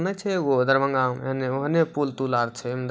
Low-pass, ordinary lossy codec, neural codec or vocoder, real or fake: none; none; none; real